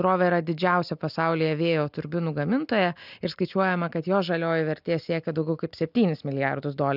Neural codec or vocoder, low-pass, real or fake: none; 5.4 kHz; real